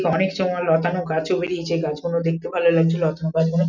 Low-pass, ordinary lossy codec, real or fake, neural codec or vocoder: 7.2 kHz; none; real; none